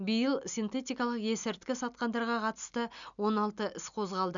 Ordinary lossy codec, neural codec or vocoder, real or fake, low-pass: none; none; real; 7.2 kHz